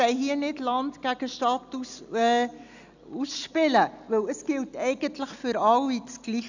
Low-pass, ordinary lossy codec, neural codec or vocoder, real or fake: 7.2 kHz; none; none; real